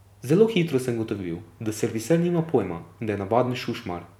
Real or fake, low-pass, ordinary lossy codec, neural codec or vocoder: real; 19.8 kHz; none; none